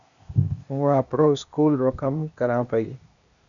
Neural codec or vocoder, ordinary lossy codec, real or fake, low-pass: codec, 16 kHz, 0.8 kbps, ZipCodec; MP3, 96 kbps; fake; 7.2 kHz